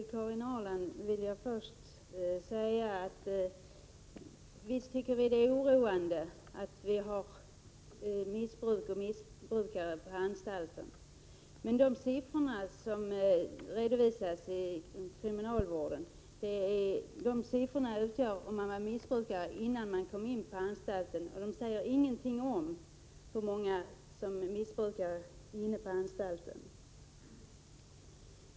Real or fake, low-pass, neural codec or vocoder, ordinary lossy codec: real; none; none; none